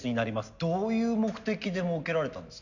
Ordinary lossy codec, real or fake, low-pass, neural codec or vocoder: none; real; 7.2 kHz; none